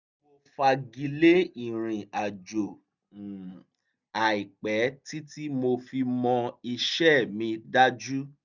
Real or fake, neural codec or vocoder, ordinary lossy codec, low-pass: real; none; none; 7.2 kHz